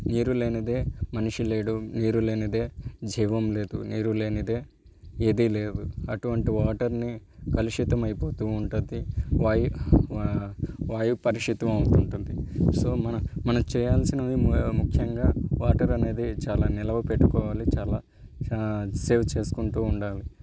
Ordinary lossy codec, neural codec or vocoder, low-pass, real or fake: none; none; none; real